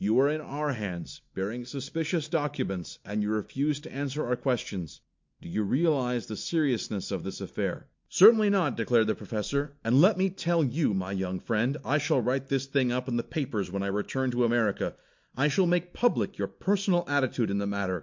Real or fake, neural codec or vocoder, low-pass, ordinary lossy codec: real; none; 7.2 kHz; MP3, 48 kbps